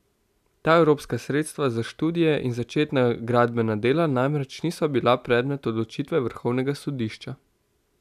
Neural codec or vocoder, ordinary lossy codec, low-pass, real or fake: none; none; 14.4 kHz; real